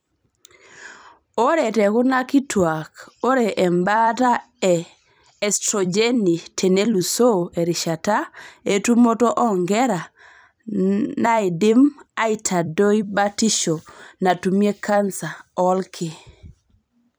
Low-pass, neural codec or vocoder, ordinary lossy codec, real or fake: none; vocoder, 44.1 kHz, 128 mel bands every 256 samples, BigVGAN v2; none; fake